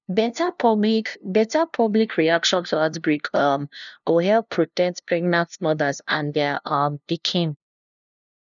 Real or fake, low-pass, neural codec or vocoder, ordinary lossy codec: fake; 7.2 kHz; codec, 16 kHz, 0.5 kbps, FunCodec, trained on LibriTTS, 25 frames a second; none